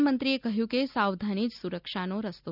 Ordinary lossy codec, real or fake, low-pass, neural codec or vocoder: none; real; 5.4 kHz; none